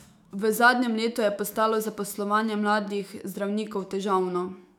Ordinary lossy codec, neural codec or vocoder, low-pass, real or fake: none; autoencoder, 48 kHz, 128 numbers a frame, DAC-VAE, trained on Japanese speech; 19.8 kHz; fake